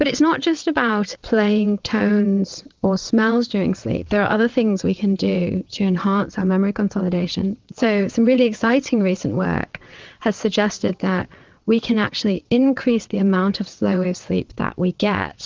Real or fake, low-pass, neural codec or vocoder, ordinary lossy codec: fake; 7.2 kHz; vocoder, 22.05 kHz, 80 mel bands, WaveNeXt; Opus, 32 kbps